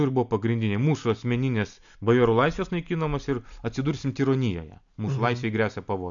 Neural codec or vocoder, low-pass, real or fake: none; 7.2 kHz; real